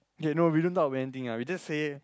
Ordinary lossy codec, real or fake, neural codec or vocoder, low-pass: none; real; none; none